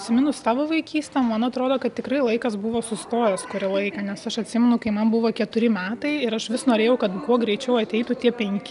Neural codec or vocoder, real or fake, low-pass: none; real; 10.8 kHz